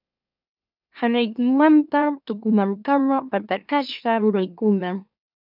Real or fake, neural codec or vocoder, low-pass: fake; autoencoder, 44.1 kHz, a latent of 192 numbers a frame, MeloTTS; 5.4 kHz